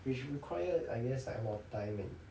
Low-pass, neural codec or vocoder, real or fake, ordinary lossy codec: none; none; real; none